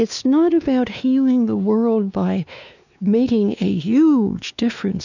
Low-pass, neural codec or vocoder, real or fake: 7.2 kHz; codec, 16 kHz, 2 kbps, X-Codec, WavLM features, trained on Multilingual LibriSpeech; fake